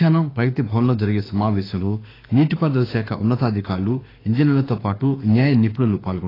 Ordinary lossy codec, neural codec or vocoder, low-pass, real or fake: AAC, 24 kbps; autoencoder, 48 kHz, 32 numbers a frame, DAC-VAE, trained on Japanese speech; 5.4 kHz; fake